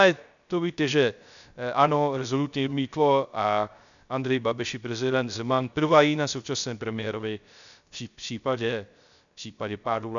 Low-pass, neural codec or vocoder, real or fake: 7.2 kHz; codec, 16 kHz, 0.3 kbps, FocalCodec; fake